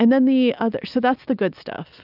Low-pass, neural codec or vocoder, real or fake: 5.4 kHz; none; real